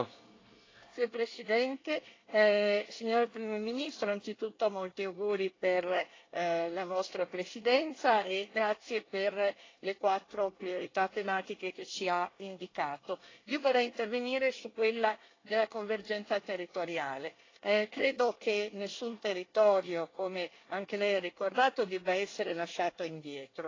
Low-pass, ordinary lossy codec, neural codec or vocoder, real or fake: 7.2 kHz; AAC, 32 kbps; codec, 24 kHz, 1 kbps, SNAC; fake